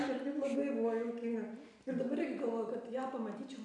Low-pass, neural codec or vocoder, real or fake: 10.8 kHz; none; real